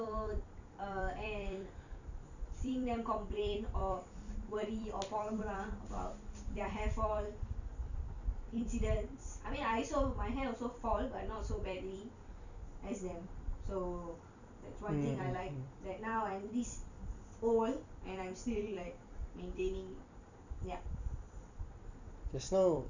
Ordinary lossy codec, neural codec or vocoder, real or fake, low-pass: none; vocoder, 44.1 kHz, 128 mel bands every 256 samples, BigVGAN v2; fake; 7.2 kHz